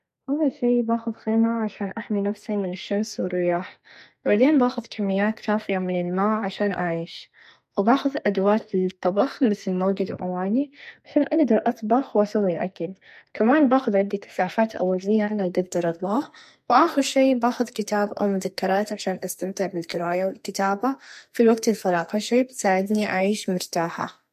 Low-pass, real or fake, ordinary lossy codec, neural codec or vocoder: 14.4 kHz; fake; MP3, 64 kbps; codec, 44.1 kHz, 2.6 kbps, SNAC